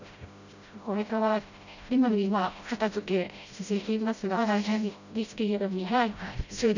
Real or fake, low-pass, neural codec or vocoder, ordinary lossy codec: fake; 7.2 kHz; codec, 16 kHz, 0.5 kbps, FreqCodec, smaller model; none